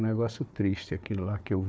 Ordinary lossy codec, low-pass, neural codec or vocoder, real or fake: none; none; codec, 16 kHz, 4 kbps, FunCodec, trained on Chinese and English, 50 frames a second; fake